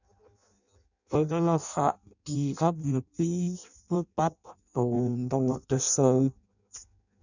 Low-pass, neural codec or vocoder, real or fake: 7.2 kHz; codec, 16 kHz in and 24 kHz out, 0.6 kbps, FireRedTTS-2 codec; fake